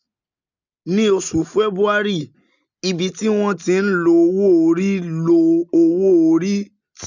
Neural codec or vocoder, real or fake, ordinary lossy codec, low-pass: none; real; none; 7.2 kHz